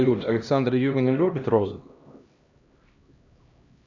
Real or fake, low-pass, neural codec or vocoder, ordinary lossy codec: fake; 7.2 kHz; codec, 16 kHz, 1 kbps, X-Codec, HuBERT features, trained on LibriSpeech; Opus, 64 kbps